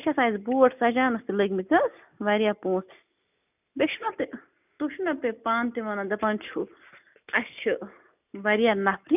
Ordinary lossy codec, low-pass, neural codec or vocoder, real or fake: none; 3.6 kHz; none; real